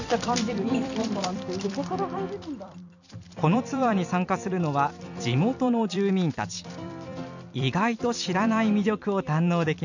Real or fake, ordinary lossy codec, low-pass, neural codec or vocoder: fake; none; 7.2 kHz; vocoder, 44.1 kHz, 128 mel bands every 256 samples, BigVGAN v2